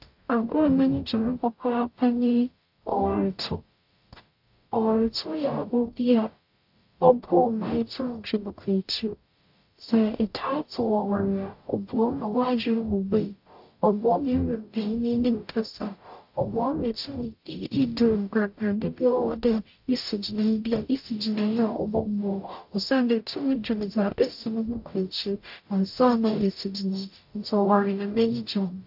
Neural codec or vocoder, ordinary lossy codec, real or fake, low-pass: codec, 44.1 kHz, 0.9 kbps, DAC; AAC, 48 kbps; fake; 5.4 kHz